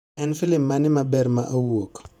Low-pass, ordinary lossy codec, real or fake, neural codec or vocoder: 19.8 kHz; none; fake; vocoder, 48 kHz, 128 mel bands, Vocos